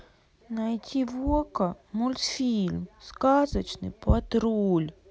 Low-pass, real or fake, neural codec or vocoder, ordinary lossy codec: none; real; none; none